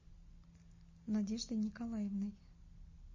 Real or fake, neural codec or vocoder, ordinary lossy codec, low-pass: real; none; MP3, 32 kbps; 7.2 kHz